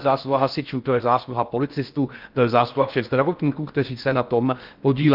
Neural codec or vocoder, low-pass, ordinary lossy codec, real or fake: codec, 16 kHz in and 24 kHz out, 0.6 kbps, FocalCodec, streaming, 4096 codes; 5.4 kHz; Opus, 24 kbps; fake